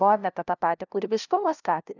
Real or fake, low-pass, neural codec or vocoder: fake; 7.2 kHz; codec, 16 kHz, 0.5 kbps, FunCodec, trained on LibriTTS, 25 frames a second